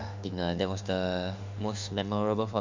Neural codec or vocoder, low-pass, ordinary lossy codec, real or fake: autoencoder, 48 kHz, 32 numbers a frame, DAC-VAE, trained on Japanese speech; 7.2 kHz; MP3, 64 kbps; fake